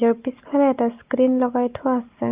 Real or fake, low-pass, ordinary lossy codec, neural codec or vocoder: real; 3.6 kHz; Opus, 24 kbps; none